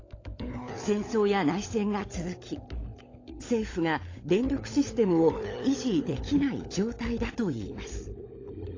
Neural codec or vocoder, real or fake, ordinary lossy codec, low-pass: codec, 16 kHz, 16 kbps, FunCodec, trained on LibriTTS, 50 frames a second; fake; AAC, 32 kbps; 7.2 kHz